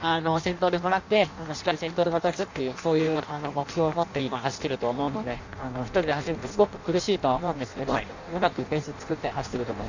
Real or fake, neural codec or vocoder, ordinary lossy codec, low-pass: fake; codec, 16 kHz in and 24 kHz out, 0.6 kbps, FireRedTTS-2 codec; Opus, 64 kbps; 7.2 kHz